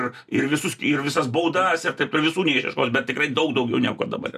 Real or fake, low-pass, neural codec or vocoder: real; 14.4 kHz; none